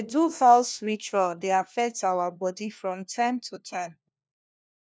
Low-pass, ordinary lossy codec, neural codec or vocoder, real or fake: none; none; codec, 16 kHz, 1 kbps, FunCodec, trained on LibriTTS, 50 frames a second; fake